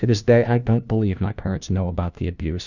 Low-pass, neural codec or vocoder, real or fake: 7.2 kHz; codec, 16 kHz, 1 kbps, FunCodec, trained on LibriTTS, 50 frames a second; fake